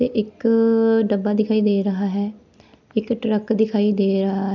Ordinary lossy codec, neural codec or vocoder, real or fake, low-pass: none; none; real; 7.2 kHz